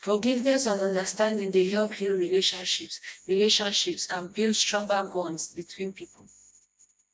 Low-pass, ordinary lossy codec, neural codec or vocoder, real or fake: none; none; codec, 16 kHz, 1 kbps, FreqCodec, smaller model; fake